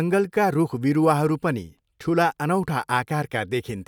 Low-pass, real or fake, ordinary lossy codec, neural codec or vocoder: 19.8 kHz; real; none; none